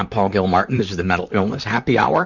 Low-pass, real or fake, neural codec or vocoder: 7.2 kHz; fake; vocoder, 44.1 kHz, 128 mel bands, Pupu-Vocoder